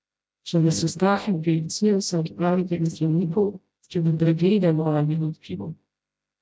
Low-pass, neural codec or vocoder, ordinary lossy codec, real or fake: none; codec, 16 kHz, 0.5 kbps, FreqCodec, smaller model; none; fake